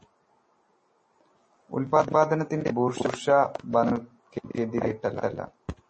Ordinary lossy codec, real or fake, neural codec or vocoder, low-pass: MP3, 32 kbps; real; none; 10.8 kHz